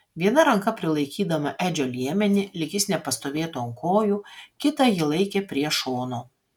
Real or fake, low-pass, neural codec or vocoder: real; 19.8 kHz; none